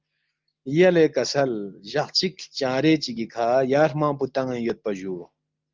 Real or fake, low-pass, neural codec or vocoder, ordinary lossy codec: real; 7.2 kHz; none; Opus, 32 kbps